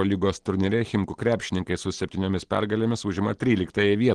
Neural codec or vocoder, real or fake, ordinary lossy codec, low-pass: none; real; Opus, 16 kbps; 9.9 kHz